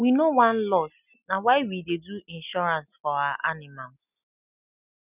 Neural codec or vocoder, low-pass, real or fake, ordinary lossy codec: none; 3.6 kHz; real; none